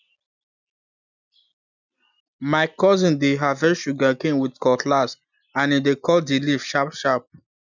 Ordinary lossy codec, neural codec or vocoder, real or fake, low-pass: none; none; real; 7.2 kHz